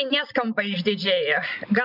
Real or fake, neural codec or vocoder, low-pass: real; none; 5.4 kHz